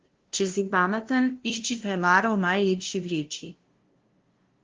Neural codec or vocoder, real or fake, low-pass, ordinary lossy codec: codec, 16 kHz, 0.5 kbps, FunCodec, trained on LibriTTS, 25 frames a second; fake; 7.2 kHz; Opus, 16 kbps